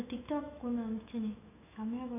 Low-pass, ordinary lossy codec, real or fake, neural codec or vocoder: 3.6 kHz; none; fake; autoencoder, 48 kHz, 128 numbers a frame, DAC-VAE, trained on Japanese speech